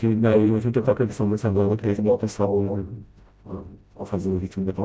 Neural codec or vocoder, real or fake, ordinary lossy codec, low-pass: codec, 16 kHz, 0.5 kbps, FreqCodec, smaller model; fake; none; none